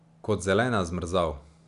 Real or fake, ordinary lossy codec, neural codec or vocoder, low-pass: real; none; none; 10.8 kHz